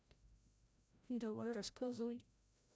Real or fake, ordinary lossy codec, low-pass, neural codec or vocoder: fake; none; none; codec, 16 kHz, 0.5 kbps, FreqCodec, larger model